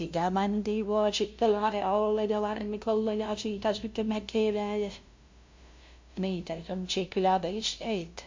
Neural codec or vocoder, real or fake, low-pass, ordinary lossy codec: codec, 16 kHz, 0.5 kbps, FunCodec, trained on LibriTTS, 25 frames a second; fake; 7.2 kHz; MP3, 48 kbps